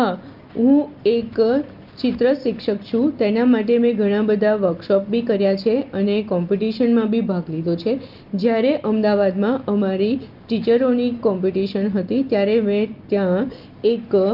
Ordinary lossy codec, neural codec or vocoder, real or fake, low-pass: Opus, 32 kbps; none; real; 5.4 kHz